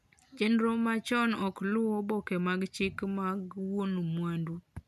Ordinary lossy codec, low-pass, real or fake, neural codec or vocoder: none; 14.4 kHz; real; none